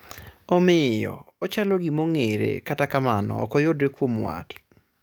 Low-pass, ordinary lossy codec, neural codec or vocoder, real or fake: none; none; codec, 44.1 kHz, 7.8 kbps, DAC; fake